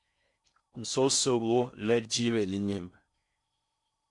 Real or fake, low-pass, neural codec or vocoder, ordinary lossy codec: fake; 10.8 kHz; codec, 16 kHz in and 24 kHz out, 0.6 kbps, FocalCodec, streaming, 4096 codes; MP3, 64 kbps